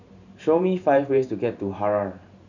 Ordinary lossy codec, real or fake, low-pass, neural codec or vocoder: none; fake; 7.2 kHz; vocoder, 44.1 kHz, 128 mel bands every 512 samples, BigVGAN v2